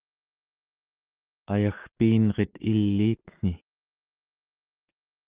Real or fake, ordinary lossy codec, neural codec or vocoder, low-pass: fake; Opus, 32 kbps; codec, 16 kHz, 6 kbps, DAC; 3.6 kHz